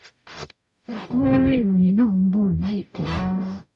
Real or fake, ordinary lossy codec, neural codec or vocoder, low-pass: fake; none; codec, 44.1 kHz, 0.9 kbps, DAC; 10.8 kHz